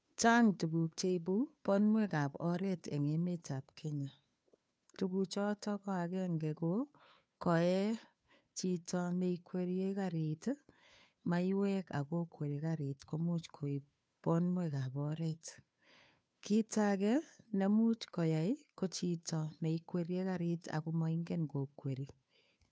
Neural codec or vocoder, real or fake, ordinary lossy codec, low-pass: codec, 16 kHz, 2 kbps, FunCodec, trained on Chinese and English, 25 frames a second; fake; none; none